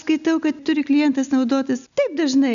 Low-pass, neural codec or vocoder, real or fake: 7.2 kHz; none; real